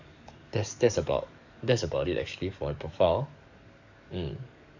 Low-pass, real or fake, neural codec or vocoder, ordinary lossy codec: 7.2 kHz; fake; codec, 44.1 kHz, 7.8 kbps, DAC; none